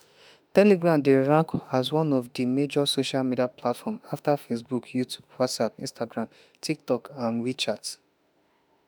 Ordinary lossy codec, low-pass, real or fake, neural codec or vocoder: none; none; fake; autoencoder, 48 kHz, 32 numbers a frame, DAC-VAE, trained on Japanese speech